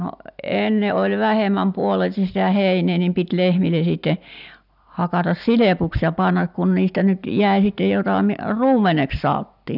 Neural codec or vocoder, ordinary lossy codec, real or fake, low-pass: none; none; real; 5.4 kHz